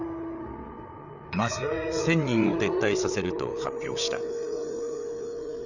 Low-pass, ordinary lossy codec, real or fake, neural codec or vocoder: 7.2 kHz; none; fake; codec, 16 kHz, 8 kbps, FreqCodec, larger model